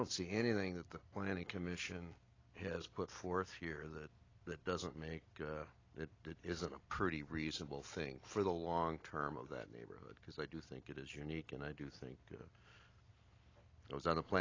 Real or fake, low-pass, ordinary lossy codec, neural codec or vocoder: real; 7.2 kHz; AAC, 32 kbps; none